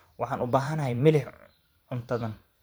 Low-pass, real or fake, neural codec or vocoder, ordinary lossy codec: none; fake; vocoder, 44.1 kHz, 128 mel bands every 512 samples, BigVGAN v2; none